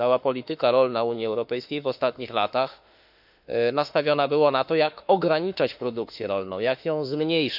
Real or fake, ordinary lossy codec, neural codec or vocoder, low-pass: fake; none; autoencoder, 48 kHz, 32 numbers a frame, DAC-VAE, trained on Japanese speech; 5.4 kHz